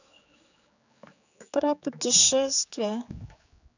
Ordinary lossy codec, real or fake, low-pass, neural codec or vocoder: none; fake; 7.2 kHz; codec, 16 kHz, 4 kbps, X-Codec, HuBERT features, trained on general audio